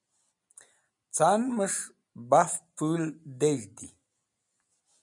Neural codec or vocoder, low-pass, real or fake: none; 10.8 kHz; real